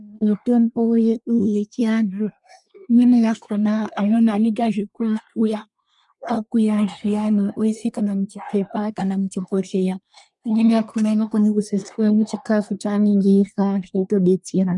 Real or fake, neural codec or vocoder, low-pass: fake; codec, 24 kHz, 1 kbps, SNAC; 10.8 kHz